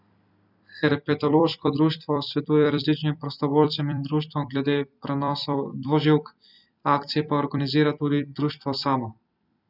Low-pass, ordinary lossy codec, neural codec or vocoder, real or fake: 5.4 kHz; none; vocoder, 44.1 kHz, 128 mel bands every 256 samples, BigVGAN v2; fake